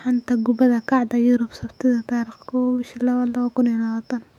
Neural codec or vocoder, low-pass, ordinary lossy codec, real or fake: autoencoder, 48 kHz, 128 numbers a frame, DAC-VAE, trained on Japanese speech; 19.8 kHz; MP3, 96 kbps; fake